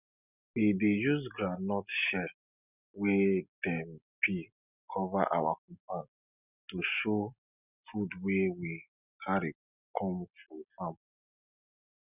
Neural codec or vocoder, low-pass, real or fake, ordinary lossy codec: none; 3.6 kHz; real; none